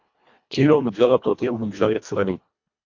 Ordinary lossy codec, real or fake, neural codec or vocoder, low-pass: MP3, 64 kbps; fake; codec, 24 kHz, 1.5 kbps, HILCodec; 7.2 kHz